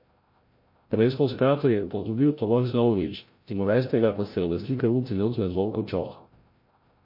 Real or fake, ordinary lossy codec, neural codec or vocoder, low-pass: fake; MP3, 32 kbps; codec, 16 kHz, 0.5 kbps, FreqCodec, larger model; 5.4 kHz